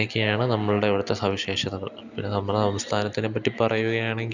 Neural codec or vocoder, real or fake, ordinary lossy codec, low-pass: none; real; none; 7.2 kHz